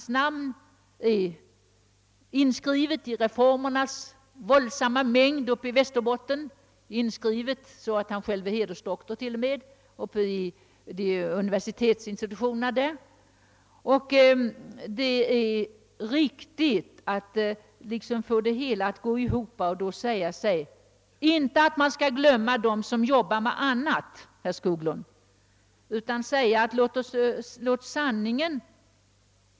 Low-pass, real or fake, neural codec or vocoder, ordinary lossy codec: none; real; none; none